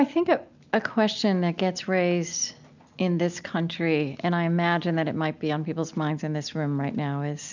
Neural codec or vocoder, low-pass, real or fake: none; 7.2 kHz; real